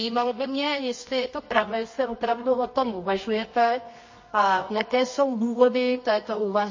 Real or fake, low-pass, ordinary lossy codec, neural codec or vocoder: fake; 7.2 kHz; MP3, 32 kbps; codec, 24 kHz, 0.9 kbps, WavTokenizer, medium music audio release